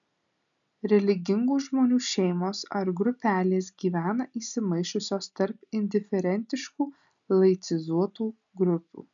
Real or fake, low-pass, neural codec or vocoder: real; 7.2 kHz; none